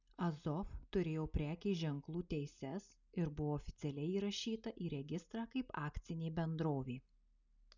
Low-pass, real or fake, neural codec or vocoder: 7.2 kHz; real; none